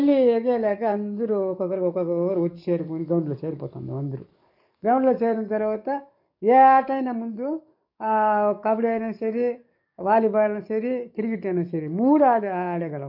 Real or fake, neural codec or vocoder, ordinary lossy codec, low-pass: fake; codec, 44.1 kHz, 7.8 kbps, DAC; none; 5.4 kHz